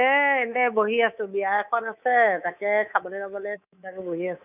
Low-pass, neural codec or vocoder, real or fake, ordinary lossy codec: 3.6 kHz; codec, 24 kHz, 3.1 kbps, DualCodec; fake; none